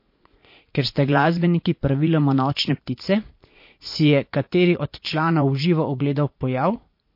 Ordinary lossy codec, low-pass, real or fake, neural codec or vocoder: MP3, 32 kbps; 5.4 kHz; fake; vocoder, 44.1 kHz, 80 mel bands, Vocos